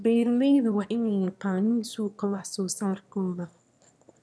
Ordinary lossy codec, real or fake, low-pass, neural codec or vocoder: none; fake; none; autoencoder, 22.05 kHz, a latent of 192 numbers a frame, VITS, trained on one speaker